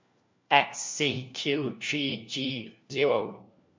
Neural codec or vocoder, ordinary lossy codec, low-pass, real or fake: codec, 16 kHz, 1 kbps, FunCodec, trained on LibriTTS, 50 frames a second; MP3, 48 kbps; 7.2 kHz; fake